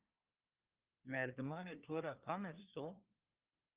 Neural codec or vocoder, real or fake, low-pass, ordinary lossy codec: codec, 24 kHz, 1 kbps, SNAC; fake; 3.6 kHz; Opus, 32 kbps